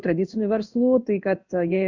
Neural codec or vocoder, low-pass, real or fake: codec, 16 kHz in and 24 kHz out, 1 kbps, XY-Tokenizer; 7.2 kHz; fake